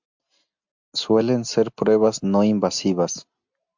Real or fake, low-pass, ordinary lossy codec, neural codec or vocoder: real; 7.2 kHz; MP3, 64 kbps; none